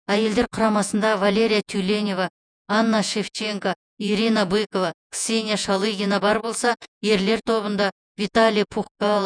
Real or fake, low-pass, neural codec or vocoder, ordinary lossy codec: fake; 9.9 kHz; vocoder, 48 kHz, 128 mel bands, Vocos; none